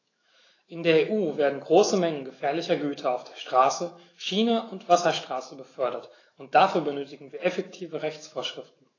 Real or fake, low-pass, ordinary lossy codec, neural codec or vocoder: fake; 7.2 kHz; AAC, 32 kbps; autoencoder, 48 kHz, 128 numbers a frame, DAC-VAE, trained on Japanese speech